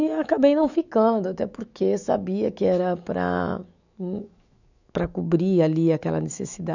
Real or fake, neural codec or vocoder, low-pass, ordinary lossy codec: fake; autoencoder, 48 kHz, 128 numbers a frame, DAC-VAE, trained on Japanese speech; 7.2 kHz; none